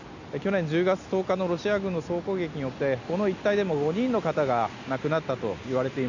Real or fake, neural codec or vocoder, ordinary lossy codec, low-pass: real; none; none; 7.2 kHz